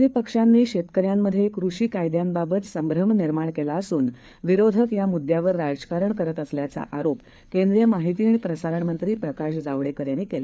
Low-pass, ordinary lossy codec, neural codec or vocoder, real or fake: none; none; codec, 16 kHz, 4 kbps, FreqCodec, larger model; fake